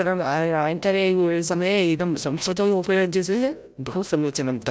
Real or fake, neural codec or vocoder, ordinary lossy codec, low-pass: fake; codec, 16 kHz, 0.5 kbps, FreqCodec, larger model; none; none